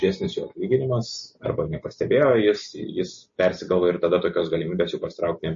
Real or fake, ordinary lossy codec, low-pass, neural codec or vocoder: real; MP3, 32 kbps; 7.2 kHz; none